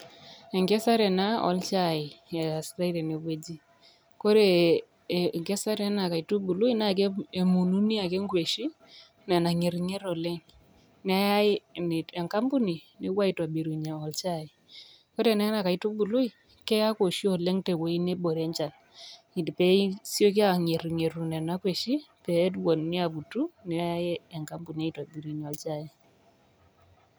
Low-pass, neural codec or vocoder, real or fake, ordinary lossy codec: none; none; real; none